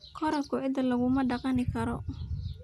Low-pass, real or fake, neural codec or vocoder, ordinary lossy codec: none; real; none; none